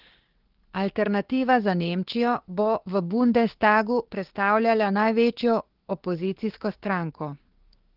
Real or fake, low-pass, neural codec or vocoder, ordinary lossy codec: fake; 5.4 kHz; codec, 16 kHz, 6 kbps, DAC; Opus, 16 kbps